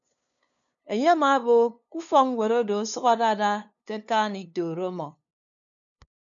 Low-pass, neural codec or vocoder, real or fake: 7.2 kHz; codec, 16 kHz, 2 kbps, FunCodec, trained on LibriTTS, 25 frames a second; fake